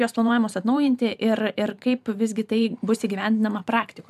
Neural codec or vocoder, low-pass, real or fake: vocoder, 44.1 kHz, 128 mel bands every 512 samples, BigVGAN v2; 14.4 kHz; fake